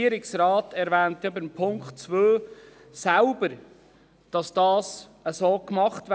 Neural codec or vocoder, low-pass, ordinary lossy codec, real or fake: none; none; none; real